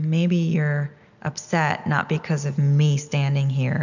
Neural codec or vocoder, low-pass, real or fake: none; 7.2 kHz; real